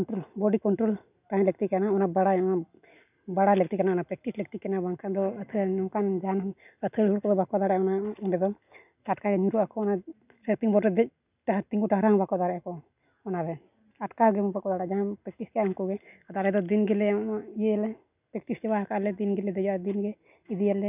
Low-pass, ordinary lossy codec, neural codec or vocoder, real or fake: 3.6 kHz; none; none; real